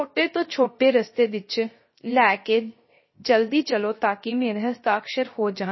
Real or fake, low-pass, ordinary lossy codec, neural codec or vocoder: fake; 7.2 kHz; MP3, 24 kbps; codec, 16 kHz, 0.3 kbps, FocalCodec